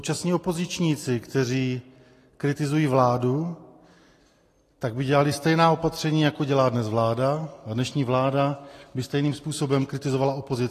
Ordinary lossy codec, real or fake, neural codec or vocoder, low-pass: AAC, 48 kbps; real; none; 14.4 kHz